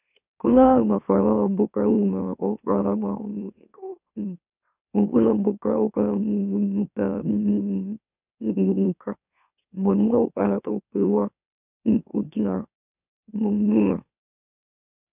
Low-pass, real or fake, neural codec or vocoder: 3.6 kHz; fake; autoencoder, 44.1 kHz, a latent of 192 numbers a frame, MeloTTS